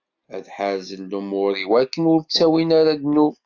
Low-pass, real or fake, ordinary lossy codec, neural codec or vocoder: 7.2 kHz; real; AAC, 48 kbps; none